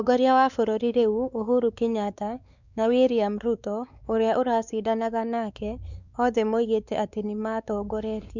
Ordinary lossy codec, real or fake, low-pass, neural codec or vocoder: none; fake; 7.2 kHz; codec, 16 kHz, 4 kbps, X-Codec, WavLM features, trained on Multilingual LibriSpeech